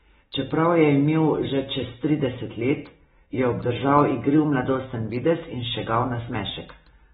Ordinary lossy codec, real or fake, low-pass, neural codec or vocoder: AAC, 16 kbps; real; 7.2 kHz; none